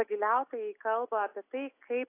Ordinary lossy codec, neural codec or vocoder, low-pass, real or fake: AAC, 24 kbps; none; 3.6 kHz; real